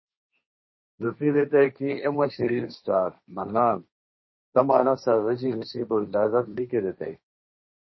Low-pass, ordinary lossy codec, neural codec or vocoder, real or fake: 7.2 kHz; MP3, 24 kbps; codec, 16 kHz, 1.1 kbps, Voila-Tokenizer; fake